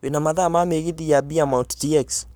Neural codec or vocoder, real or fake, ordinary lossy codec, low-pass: codec, 44.1 kHz, 7.8 kbps, Pupu-Codec; fake; none; none